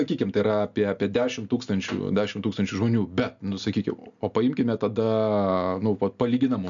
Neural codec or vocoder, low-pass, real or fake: none; 7.2 kHz; real